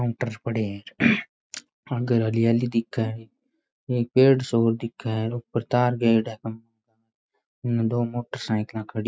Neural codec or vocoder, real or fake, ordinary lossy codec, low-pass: none; real; none; none